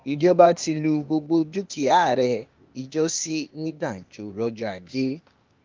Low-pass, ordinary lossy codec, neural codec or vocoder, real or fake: 7.2 kHz; Opus, 24 kbps; codec, 16 kHz, 0.8 kbps, ZipCodec; fake